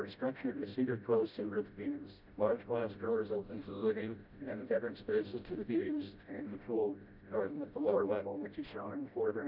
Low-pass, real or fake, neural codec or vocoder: 5.4 kHz; fake; codec, 16 kHz, 0.5 kbps, FreqCodec, smaller model